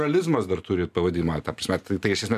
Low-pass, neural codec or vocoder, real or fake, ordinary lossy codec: 14.4 kHz; none; real; MP3, 96 kbps